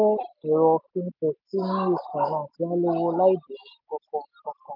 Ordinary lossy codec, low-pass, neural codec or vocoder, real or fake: none; 5.4 kHz; none; real